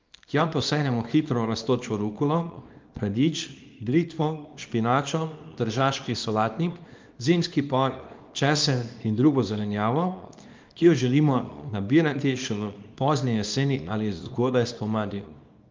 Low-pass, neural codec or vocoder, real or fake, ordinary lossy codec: 7.2 kHz; codec, 24 kHz, 0.9 kbps, WavTokenizer, small release; fake; Opus, 32 kbps